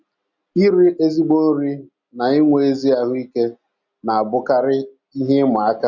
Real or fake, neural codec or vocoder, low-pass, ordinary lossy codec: real; none; 7.2 kHz; none